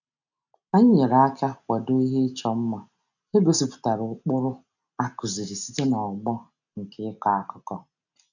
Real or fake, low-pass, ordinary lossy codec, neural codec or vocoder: real; 7.2 kHz; none; none